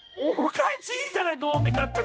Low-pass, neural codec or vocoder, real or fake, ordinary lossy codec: none; codec, 16 kHz, 1 kbps, X-Codec, HuBERT features, trained on general audio; fake; none